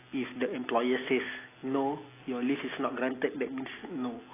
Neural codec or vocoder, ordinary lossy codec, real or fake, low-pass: none; AAC, 16 kbps; real; 3.6 kHz